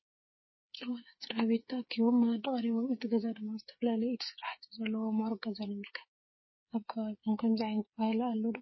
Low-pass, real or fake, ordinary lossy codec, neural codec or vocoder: 7.2 kHz; fake; MP3, 24 kbps; codec, 16 kHz, 8 kbps, FreqCodec, smaller model